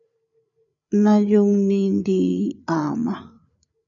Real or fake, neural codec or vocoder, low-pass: fake; codec, 16 kHz, 8 kbps, FreqCodec, larger model; 7.2 kHz